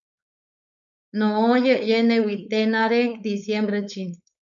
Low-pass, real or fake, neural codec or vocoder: 7.2 kHz; fake; codec, 16 kHz, 4.8 kbps, FACodec